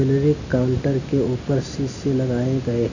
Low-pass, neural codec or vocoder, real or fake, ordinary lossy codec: 7.2 kHz; none; real; none